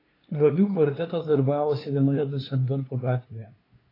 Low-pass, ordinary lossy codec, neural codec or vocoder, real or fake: 5.4 kHz; AAC, 24 kbps; codec, 16 kHz, 2 kbps, FunCodec, trained on LibriTTS, 25 frames a second; fake